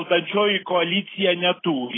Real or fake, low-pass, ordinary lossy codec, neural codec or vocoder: real; 7.2 kHz; AAC, 16 kbps; none